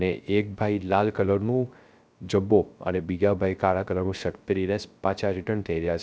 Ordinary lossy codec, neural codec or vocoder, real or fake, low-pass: none; codec, 16 kHz, 0.3 kbps, FocalCodec; fake; none